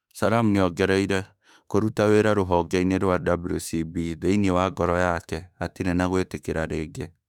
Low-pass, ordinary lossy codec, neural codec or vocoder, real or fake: 19.8 kHz; none; autoencoder, 48 kHz, 32 numbers a frame, DAC-VAE, trained on Japanese speech; fake